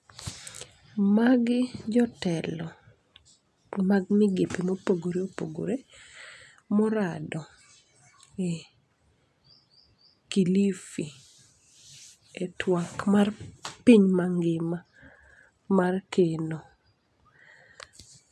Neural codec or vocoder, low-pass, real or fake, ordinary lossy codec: none; none; real; none